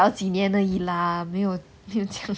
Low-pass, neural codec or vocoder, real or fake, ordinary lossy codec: none; none; real; none